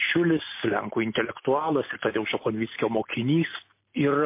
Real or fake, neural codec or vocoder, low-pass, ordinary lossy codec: real; none; 3.6 kHz; MP3, 24 kbps